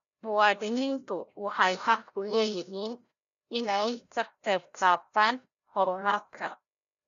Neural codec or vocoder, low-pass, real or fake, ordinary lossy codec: codec, 16 kHz, 0.5 kbps, FreqCodec, larger model; 7.2 kHz; fake; MP3, 96 kbps